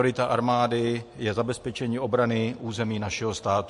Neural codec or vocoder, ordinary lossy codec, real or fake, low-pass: none; MP3, 48 kbps; real; 14.4 kHz